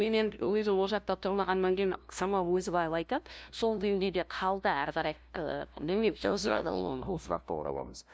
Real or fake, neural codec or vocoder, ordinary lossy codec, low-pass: fake; codec, 16 kHz, 0.5 kbps, FunCodec, trained on LibriTTS, 25 frames a second; none; none